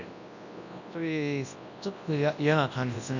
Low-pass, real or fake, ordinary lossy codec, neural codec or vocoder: 7.2 kHz; fake; none; codec, 24 kHz, 0.9 kbps, WavTokenizer, large speech release